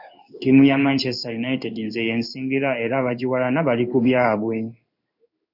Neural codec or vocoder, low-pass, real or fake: codec, 16 kHz in and 24 kHz out, 1 kbps, XY-Tokenizer; 5.4 kHz; fake